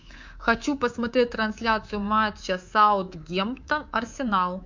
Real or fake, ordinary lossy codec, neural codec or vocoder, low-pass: fake; MP3, 64 kbps; codec, 24 kHz, 3.1 kbps, DualCodec; 7.2 kHz